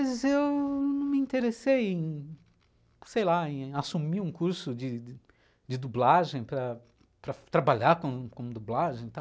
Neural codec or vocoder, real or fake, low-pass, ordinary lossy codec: none; real; none; none